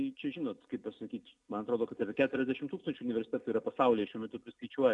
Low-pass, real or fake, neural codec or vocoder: 10.8 kHz; real; none